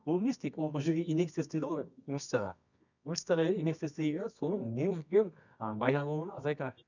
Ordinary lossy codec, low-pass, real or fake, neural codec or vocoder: none; 7.2 kHz; fake; codec, 24 kHz, 0.9 kbps, WavTokenizer, medium music audio release